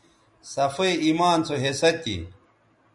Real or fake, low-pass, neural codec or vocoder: real; 10.8 kHz; none